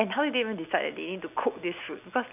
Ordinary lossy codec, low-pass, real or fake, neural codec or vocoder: none; 3.6 kHz; real; none